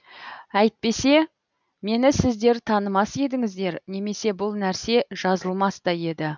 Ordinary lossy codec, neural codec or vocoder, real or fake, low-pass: none; none; real; 7.2 kHz